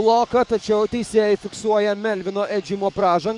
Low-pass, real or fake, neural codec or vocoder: 10.8 kHz; fake; codec, 44.1 kHz, 7.8 kbps, DAC